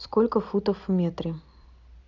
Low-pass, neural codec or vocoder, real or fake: 7.2 kHz; none; real